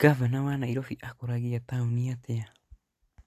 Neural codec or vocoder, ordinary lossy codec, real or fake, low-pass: none; AAC, 64 kbps; real; 14.4 kHz